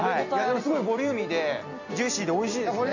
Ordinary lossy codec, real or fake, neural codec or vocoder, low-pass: none; real; none; 7.2 kHz